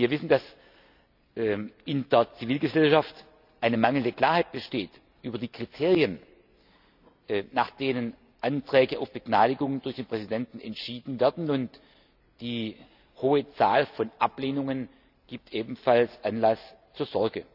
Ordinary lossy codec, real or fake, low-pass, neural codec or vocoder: none; real; 5.4 kHz; none